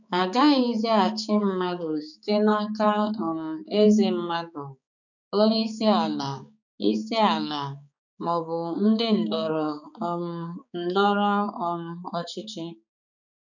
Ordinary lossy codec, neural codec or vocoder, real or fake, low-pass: none; codec, 16 kHz, 4 kbps, X-Codec, HuBERT features, trained on balanced general audio; fake; 7.2 kHz